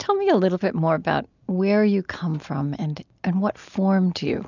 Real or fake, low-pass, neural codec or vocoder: real; 7.2 kHz; none